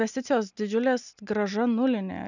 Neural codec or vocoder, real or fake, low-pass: none; real; 7.2 kHz